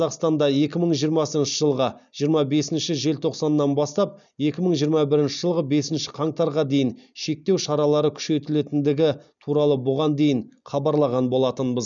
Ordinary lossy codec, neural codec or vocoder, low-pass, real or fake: none; none; 7.2 kHz; real